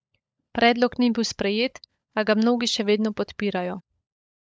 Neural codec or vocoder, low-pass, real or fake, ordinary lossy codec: codec, 16 kHz, 16 kbps, FunCodec, trained on LibriTTS, 50 frames a second; none; fake; none